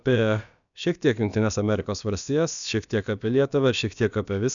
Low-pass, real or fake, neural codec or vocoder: 7.2 kHz; fake; codec, 16 kHz, about 1 kbps, DyCAST, with the encoder's durations